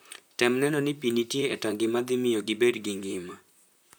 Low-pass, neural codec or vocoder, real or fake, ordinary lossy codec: none; vocoder, 44.1 kHz, 128 mel bands, Pupu-Vocoder; fake; none